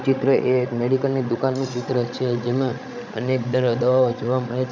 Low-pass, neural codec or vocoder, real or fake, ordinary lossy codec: 7.2 kHz; codec, 16 kHz, 16 kbps, FreqCodec, larger model; fake; none